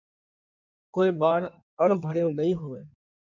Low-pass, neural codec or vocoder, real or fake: 7.2 kHz; codec, 16 kHz in and 24 kHz out, 1.1 kbps, FireRedTTS-2 codec; fake